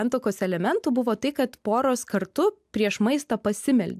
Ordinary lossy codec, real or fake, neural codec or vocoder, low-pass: AAC, 96 kbps; real; none; 14.4 kHz